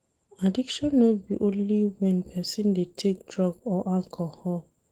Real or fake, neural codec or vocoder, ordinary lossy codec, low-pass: fake; autoencoder, 48 kHz, 128 numbers a frame, DAC-VAE, trained on Japanese speech; Opus, 16 kbps; 19.8 kHz